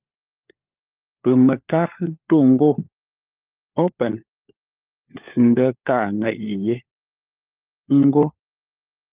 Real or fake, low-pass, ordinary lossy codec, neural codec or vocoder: fake; 3.6 kHz; Opus, 24 kbps; codec, 16 kHz, 4 kbps, FunCodec, trained on LibriTTS, 50 frames a second